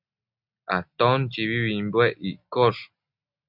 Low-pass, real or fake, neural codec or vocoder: 5.4 kHz; real; none